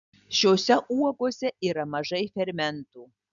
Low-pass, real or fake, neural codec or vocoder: 7.2 kHz; real; none